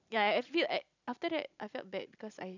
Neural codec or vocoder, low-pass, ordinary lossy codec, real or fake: none; 7.2 kHz; none; real